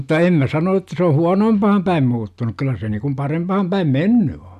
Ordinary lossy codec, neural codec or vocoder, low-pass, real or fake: none; none; 14.4 kHz; real